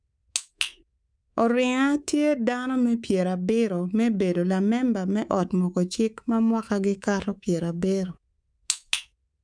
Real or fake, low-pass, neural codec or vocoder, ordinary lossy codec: fake; 9.9 kHz; codec, 24 kHz, 3.1 kbps, DualCodec; none